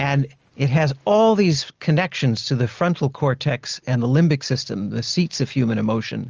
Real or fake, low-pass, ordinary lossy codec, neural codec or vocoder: real; 7.2 kHz; Opus, 24 kbps; none